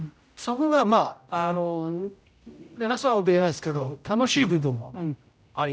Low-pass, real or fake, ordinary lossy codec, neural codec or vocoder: none; fake; none; codec, 16 kHz, 0.5 kbps, X-Codec, HuBERT features, trained on general audio